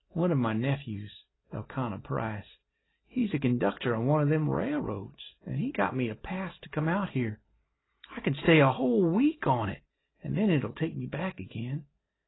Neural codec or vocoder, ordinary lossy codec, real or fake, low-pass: none; AAC, 16 kbps; real; 7.2 kHz